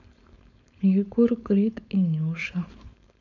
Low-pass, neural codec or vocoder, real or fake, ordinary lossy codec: 7.2 kHz; codec, 16 kHz, 4.8 kbps, FACodec; fake; none